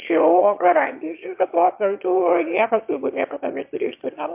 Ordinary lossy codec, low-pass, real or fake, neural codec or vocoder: MP3, 32 kbps; 3.6 kHz; fake; autoencoder, 22.05 kHz, a latent of 192 numbers a frame, VITS, trained on one speaker